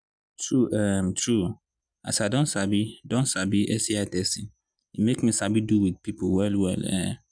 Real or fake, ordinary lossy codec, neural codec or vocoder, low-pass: real; none; none; 9.9 kHz